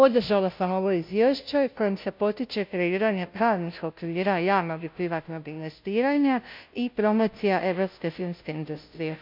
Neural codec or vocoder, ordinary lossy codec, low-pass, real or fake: codec, 16 kHz, 0.5 kbps, FunCodec, trained on Chinese and English, 25 frames a second; MP3, 48 kbps; 5.4 kHz; fake